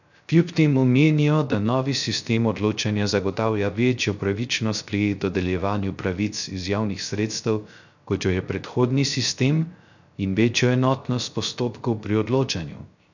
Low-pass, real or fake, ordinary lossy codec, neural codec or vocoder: 7.2 kHz; fake; none; codec, 16 kHz, 0.3 kbps, FocalCodec